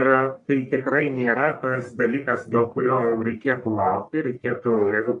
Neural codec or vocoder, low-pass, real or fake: codec, 44.1 kHz, 1.7 kbps, Pupu-Codec; 10.8 kHz; fake